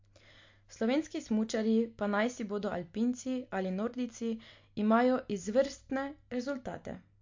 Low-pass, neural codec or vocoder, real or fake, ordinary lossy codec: 7.2 kHz; none; real; MP3, 48 kbps